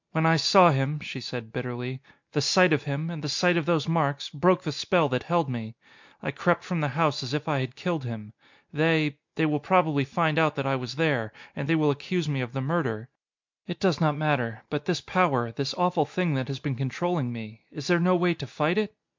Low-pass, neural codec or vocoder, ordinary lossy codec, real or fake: 7.2 kHz; none; MP3, 64 kbps; real